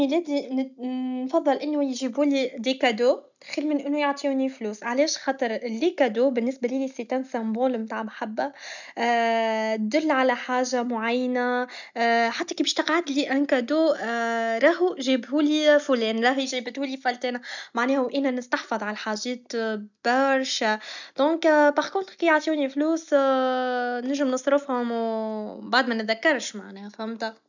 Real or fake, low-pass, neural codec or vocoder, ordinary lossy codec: real; 7.2 kHz; none; none